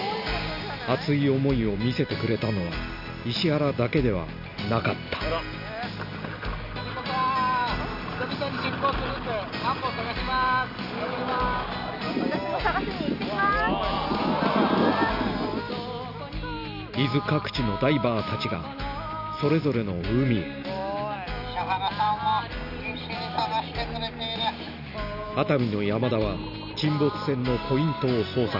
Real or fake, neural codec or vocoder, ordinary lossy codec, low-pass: real; none; none; 5.4 kHz